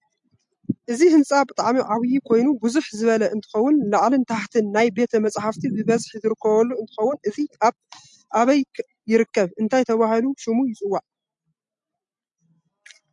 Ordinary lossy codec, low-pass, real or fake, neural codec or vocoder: MP3, 64 kbps; 10.8 kHz; real; none